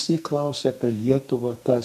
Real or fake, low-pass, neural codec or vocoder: fake; 14.4 kHz; codec, 44.1 kHz, 2.6 kbps, SNAC